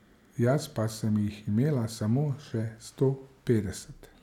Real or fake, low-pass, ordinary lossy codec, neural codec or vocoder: real; 19.8 kHz; none; none